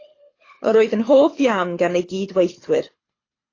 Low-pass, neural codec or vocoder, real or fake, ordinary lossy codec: 7.2 kHz; codec, 24 kHz, 6 kbps, HILCodec; fake; AAC, 32 kbps